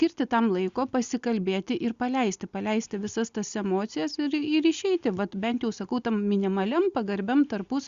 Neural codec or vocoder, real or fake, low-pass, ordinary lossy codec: none; real; 7.2 kHz; Opus, 64 kbps